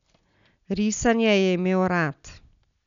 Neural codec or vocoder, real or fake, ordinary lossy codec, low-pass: none; real; none; 7.2 kHz